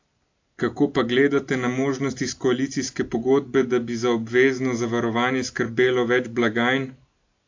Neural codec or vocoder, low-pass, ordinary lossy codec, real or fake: none; 7.2 kHz; AAC, 48 kbps; real